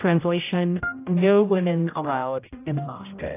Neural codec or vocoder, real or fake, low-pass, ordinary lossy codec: codec, 16 kHz, 0.5 kbps, X-Codec, HuBERT features, trained on general audio; fake; 3.6 kHz; AAC, 24 kbps